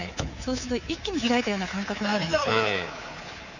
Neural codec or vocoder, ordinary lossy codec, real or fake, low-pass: codec, 24 kHz, 3.1 kbps, DualCodec; none; fake; 7.2 kHz